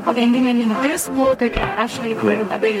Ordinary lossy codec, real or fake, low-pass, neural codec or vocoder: MP3, 64 kbps; fake; 19.8 kHz; codec, 44.1 kHz, 0.9 kbps, DAC